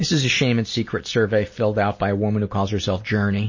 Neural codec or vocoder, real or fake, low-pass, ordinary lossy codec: none; real; 7.2 kHz; MP3, 32 kbps